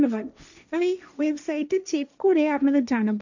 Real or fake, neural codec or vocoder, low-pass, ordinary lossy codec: fake; codec, 16 kHz, 1.1 kbps, Voila-Tokenizer; 7.2 kHz; none